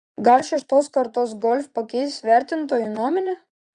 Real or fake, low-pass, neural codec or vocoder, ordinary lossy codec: fake; 9.9 kHz; vocoder, 22.05 kHz, 80 mel bands, Vocos; Opus, 64 kbps